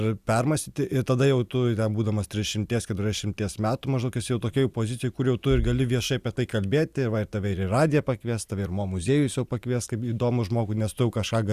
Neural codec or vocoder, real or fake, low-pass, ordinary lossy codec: none; real; 14.4 kHz; Opus, 64 kbps